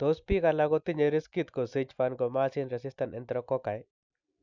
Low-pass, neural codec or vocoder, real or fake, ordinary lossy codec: 7.2 kHz; none; real; none